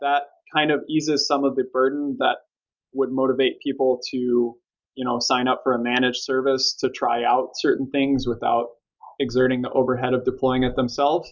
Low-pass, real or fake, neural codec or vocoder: 7.2 kHz; real; none